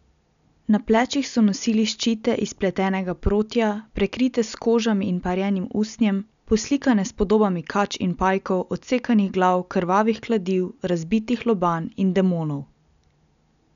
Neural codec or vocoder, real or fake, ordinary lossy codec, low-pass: none; real; none; 7.2 kHz